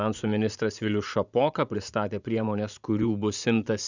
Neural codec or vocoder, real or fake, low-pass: vocoder, 44.1 kHz, 80 mel bands, Vocos; fake; 7.2 kHz